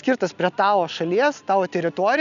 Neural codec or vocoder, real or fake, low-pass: none; real; 7.2 kHz